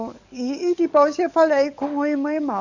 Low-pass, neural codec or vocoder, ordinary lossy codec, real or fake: 7.2 kHz; codec, 44.1 kHz, 7.8 kbps, DAC; none; fake